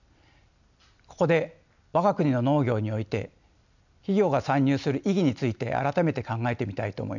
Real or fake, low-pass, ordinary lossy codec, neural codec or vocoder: real; 7.2 kHz; none; none